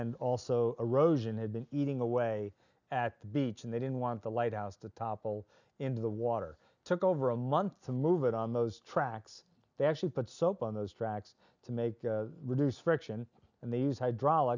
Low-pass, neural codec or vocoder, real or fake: 7.2 kHz; none; real